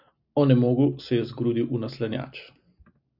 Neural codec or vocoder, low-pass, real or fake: none; 5.4 kHz; real